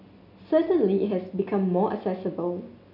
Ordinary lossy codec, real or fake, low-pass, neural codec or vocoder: none; real; 5.4 kHz; none